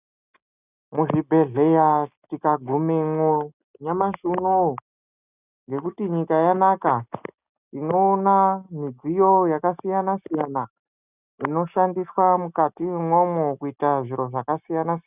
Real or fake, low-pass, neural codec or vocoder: real; 3.6 kHz; none